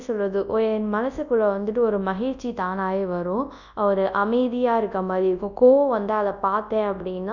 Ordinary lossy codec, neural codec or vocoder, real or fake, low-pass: none; codec, 24 kHz, 0.9 kbps, WavTokenizer, large speech release; fake; 7.2 kHz